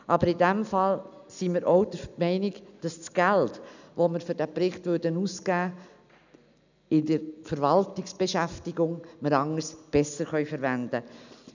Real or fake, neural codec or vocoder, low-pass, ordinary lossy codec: real; none; 7.2 kHz; none